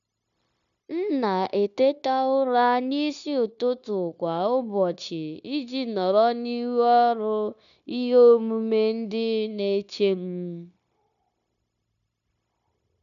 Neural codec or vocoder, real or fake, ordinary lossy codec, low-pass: codec, 16 kHz, 0.9 kbps, LongCat-Audio-Codec; fake; none; 7.2 kHz